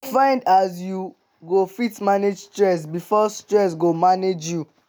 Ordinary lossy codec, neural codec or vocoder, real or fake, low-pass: none; none; real; none